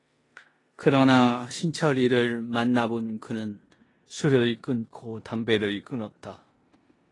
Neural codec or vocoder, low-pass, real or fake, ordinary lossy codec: codec, 16 kHz in and 24 kHz out, 0.9 kbps, LongCat-Audio-Codec, four codebook decoder; 10.8 kHz; fake; AAC, 32 kbps